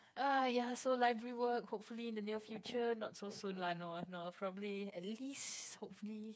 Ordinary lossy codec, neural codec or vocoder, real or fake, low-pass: none; codec, 16 kHz, 4 kbps, FreqCodec, smaller model; fake; none